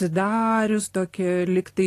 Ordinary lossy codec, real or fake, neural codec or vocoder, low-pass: AAC, 48 kbps; real; none; 14.4 kHz